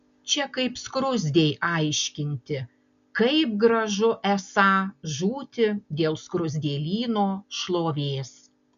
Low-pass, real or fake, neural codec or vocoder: 7.2 kHz; real; none